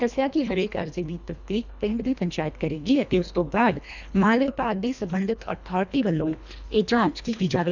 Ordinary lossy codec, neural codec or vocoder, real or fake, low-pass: none; codec, 24 kHz, 1.5 kbps, HILCodec; fake; 7.2 kHz